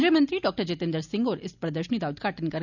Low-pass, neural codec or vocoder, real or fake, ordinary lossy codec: 7.2 kHz; none; real; none